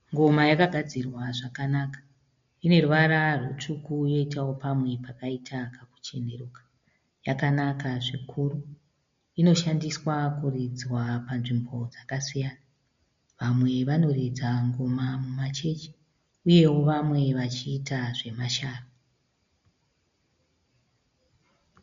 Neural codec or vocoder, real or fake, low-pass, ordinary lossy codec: none; real; 7.2 kHz; MP3, 48 kbps